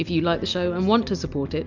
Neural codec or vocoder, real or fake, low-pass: none; real; 7.2 kHz